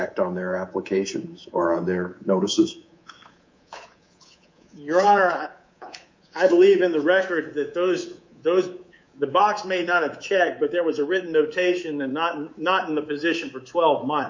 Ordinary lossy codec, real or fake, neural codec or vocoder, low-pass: MP3, 48 kbps; fake; codec, 24 kHz, 3.1 kbps, DualCodec; 7.2 kHz